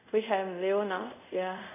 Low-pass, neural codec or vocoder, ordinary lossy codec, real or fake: 3.6 kHz; codec, 24 kHz, 0.5 kbps, DualCodec; none; fake